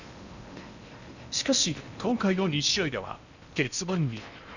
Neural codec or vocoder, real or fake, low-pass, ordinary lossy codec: codec, 16 kHz in and 24 kHz out, 0.8 kbps, FocalCodec, streaming, 65536 codes; fake; 7.2 kHz; none